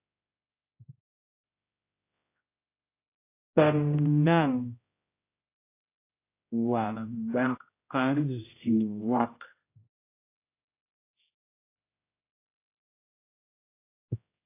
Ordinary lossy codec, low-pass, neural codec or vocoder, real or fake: AAC, 24 kbps; 3.6 kHz; codec, 16 kHz, 0.5 kbps, X-Codec, HuBERT features, trained on general audio; fake